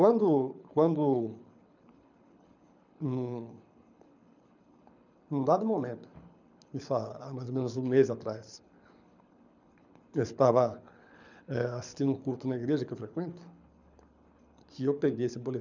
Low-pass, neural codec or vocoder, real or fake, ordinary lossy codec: 7.2 kHz; codec, 24 kHz, 6 kbps, HILCodec; fake; none